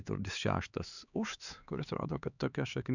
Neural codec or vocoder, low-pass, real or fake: codec, 16 kHz, 4 kbps, X-Codec, HuBERT features, trained on LibriSpeech; 7.2 kHz; fake